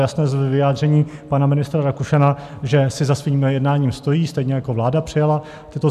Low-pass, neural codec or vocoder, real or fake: 14.4 kHz; none; real